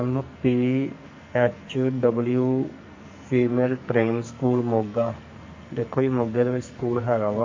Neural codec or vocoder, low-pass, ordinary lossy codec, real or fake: codec, 44.1 kHz, 2.6 kbps, SNAC; 7.2 kHz; MP3, 48 kbps; fake